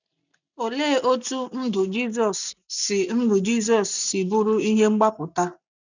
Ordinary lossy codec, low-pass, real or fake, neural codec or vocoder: none; 7.2 kHz; real; none